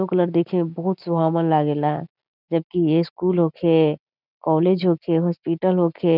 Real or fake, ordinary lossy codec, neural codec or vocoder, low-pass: real; none; none; 5.4 kHz